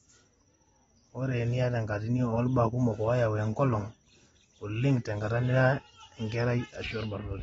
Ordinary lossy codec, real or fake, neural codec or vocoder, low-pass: AAC, 24 kbps; real; none; 19.8 kHz